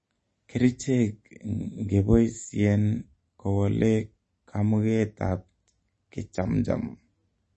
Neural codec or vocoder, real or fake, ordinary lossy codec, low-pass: none; real; MP3, 32 kbps; 10.8 kHz